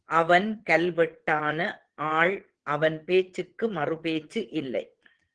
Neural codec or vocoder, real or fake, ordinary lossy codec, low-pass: vocoder, 22.05 kHz, 80 mel bands, WaveNeXt; fake; Opus, 16 kbps; 9.9 kHz